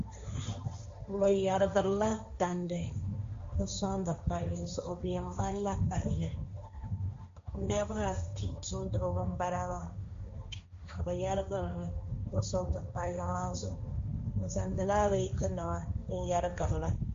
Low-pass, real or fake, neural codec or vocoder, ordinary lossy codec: 7.2 kHz; fake; codec, 16 kHz, 1.1 kbps, Voila-Tokenizer; MP3, 48 kbps